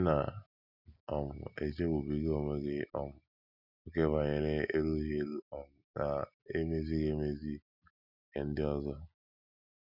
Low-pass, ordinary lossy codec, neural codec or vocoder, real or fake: 5.4 kHz; none; none; real